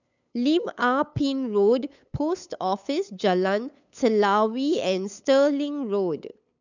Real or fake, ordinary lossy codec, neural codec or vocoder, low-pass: fake; none; codec, 16 kHz, 8 kbps, FunCodec, trained on LibriTTS, 25 frames a second; 7.2 kHz